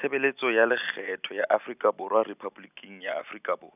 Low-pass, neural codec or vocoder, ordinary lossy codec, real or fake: 3.6 kHz; none; none; real